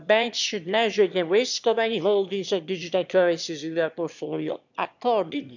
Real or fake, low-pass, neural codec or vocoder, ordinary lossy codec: fake; 7.2 kHz; autoencoder, 22.05 kHz, a latent of 192 numbers a frame, VITS, trained on one speaker; none